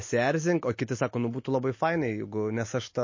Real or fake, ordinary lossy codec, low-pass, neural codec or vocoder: real; MP3, 32 kbps; 7.2 kHz; none